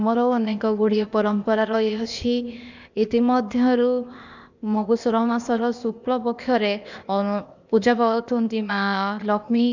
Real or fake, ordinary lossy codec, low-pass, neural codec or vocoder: fake; none; 7.2 kHz; codec, 16 kHz, 0.8 kbps, ZipCodec